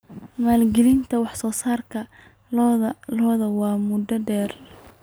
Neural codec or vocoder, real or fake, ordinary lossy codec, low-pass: none; real; none; none